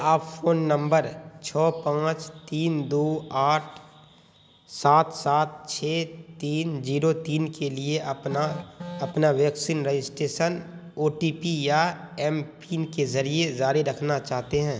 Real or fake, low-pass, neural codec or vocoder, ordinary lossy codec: real; none; none; none